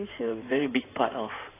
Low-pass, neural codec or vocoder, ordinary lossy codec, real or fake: 3.6 kHz; codec, 16 kHz in and 24 kHz out, 2.2 kbps, FireRedTTS-2 codec; AAC, 24 kbps; fake